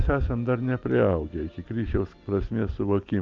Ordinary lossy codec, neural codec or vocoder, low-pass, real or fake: Opus, 32 kbps; none; 7.2 kHz; real